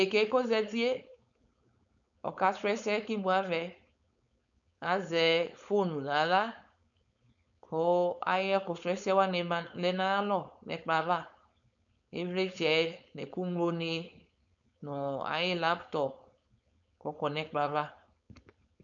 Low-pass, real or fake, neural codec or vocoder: 7.2 kHz; fake; codec, 16 kHz, 4.8 kbps, FACodec